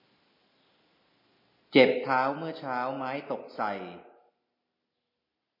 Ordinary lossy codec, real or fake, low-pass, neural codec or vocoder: MP3, 24 kbps; real; 5.4 kHz; none